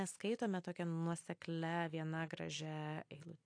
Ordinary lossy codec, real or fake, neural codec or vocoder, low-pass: AAC, 48 kbps; real; none; 9.9 kHz